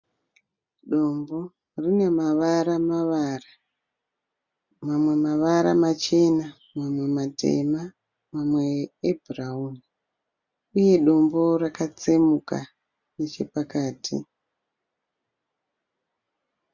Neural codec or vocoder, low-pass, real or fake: none; 7.2 kHz; real